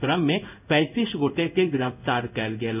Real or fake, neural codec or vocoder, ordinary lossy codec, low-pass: fake; codec, 16 kHz in and 24 kHz out, 1 kbps, XY-Tokenizer; none; 3.6 kHz